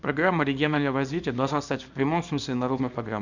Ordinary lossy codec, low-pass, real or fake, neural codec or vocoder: none; 7.2 kHz; fake; codec, 24 kHz, 0.9 kbps, WavTokenizer, small release